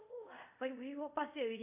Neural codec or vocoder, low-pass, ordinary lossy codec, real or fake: codec, 24 kHz, 0.5 kbps, DualCodec; 3.6 kHz; none; fake